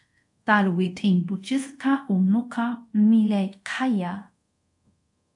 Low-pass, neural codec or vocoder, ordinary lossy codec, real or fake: 10.8 kHz; codec, 24 kHz, 0.5 kbps, DualCodec; MP3, 64 kbps; fake